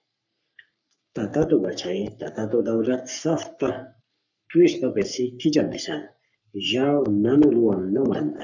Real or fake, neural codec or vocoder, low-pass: fake; codec, 44.1 kHz, 3.4 kbps, Pupu-Codec; 7.2 kHz